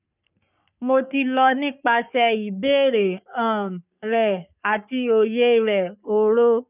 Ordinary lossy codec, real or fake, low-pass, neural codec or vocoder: none; fake; 3.6 kHz; codec, 44.1 kHz, 3.4 kbps, Pupu-Codec